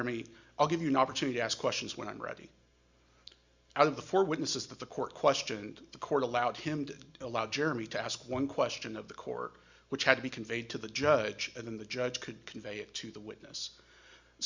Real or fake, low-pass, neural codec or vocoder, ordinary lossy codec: real; 7.2 kHz; none; Opus, 64 kbps